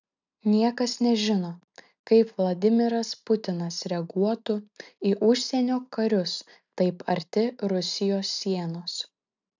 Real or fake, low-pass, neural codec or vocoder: real; 7.2 kHz; none